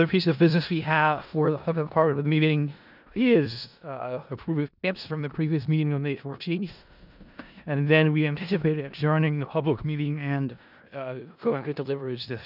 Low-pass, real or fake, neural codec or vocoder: 5.4 kHz; fake; codec, 16 kHz in and 24 kHz out, 0.4 kbps, LongCat-Audio-Codec, four codebook decoder